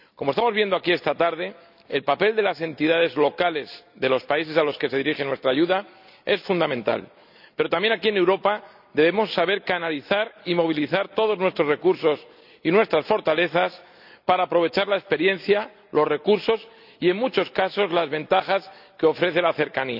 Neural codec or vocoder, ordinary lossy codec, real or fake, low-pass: none; none; real; 5.4 kHz